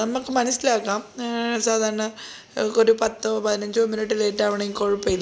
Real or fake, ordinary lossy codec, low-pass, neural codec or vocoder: real; none; none; none